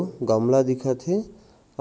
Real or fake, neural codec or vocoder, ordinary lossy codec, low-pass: real; none; none; none